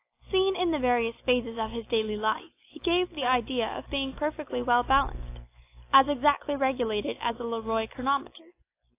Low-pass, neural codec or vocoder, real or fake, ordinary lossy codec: 3.6 kHz; none; real; AAC, 24 kbps